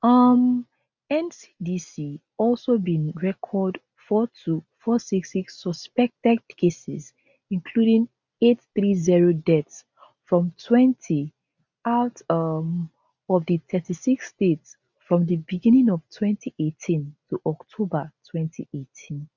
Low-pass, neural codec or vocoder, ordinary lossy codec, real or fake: 7.2 kHz; none; none; real